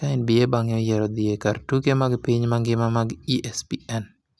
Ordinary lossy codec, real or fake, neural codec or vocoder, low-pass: none; real; none; none